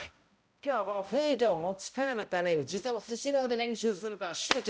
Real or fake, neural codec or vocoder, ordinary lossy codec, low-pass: fake; codec, 16 kHz, 0.5 kbps, X-Codec, HuBERT features, trained on balanced general audio; none; none